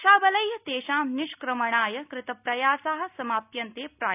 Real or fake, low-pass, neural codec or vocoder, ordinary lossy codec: real; 3.6 kHz; none; none